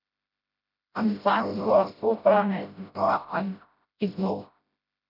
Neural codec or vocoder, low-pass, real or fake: codec, 16 kHz, 0.5 kbps, FreqCodec, smaller model; 5.4 kHz; fake